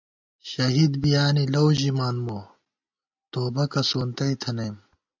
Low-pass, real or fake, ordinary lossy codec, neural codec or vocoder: 7.2 kHz; real; MP3, 64 kbps; none